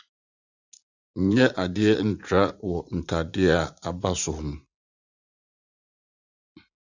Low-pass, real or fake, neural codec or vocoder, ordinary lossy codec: 7.2 kHz; fake; vocoder, 44.1 kHz, 80 mel bands, Vocos; Opus, 64 kbps